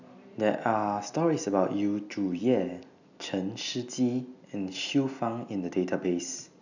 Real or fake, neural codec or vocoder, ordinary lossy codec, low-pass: real; none; none; 7.2 kHz